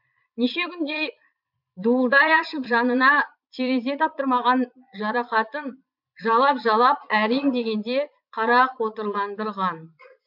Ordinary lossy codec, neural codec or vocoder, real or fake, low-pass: MP3, 48 kbps; vocoder, 22.05 kHz, 80 mel bands, Vocos; fake; 5.4 kHz